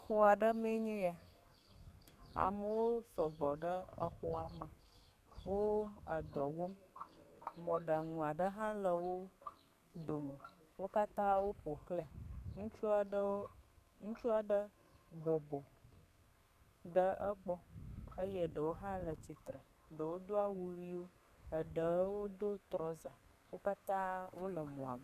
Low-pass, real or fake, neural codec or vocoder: 14.4 kHz; fake; codec, 32 kHz, 1.9 kbps, SNAC